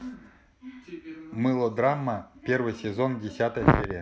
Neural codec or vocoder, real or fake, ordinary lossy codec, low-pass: none; real; none; none